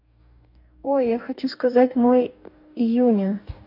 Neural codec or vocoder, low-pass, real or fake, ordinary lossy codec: codec, 44.1 kHz, 2.6 kbps, DAC; 5.4 kHz; fake; none